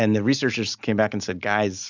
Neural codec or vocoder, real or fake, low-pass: none; real; 7.2 kHz